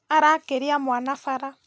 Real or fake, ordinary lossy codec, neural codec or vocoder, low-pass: real; none; none; none